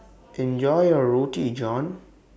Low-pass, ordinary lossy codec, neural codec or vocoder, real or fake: none; none; none; real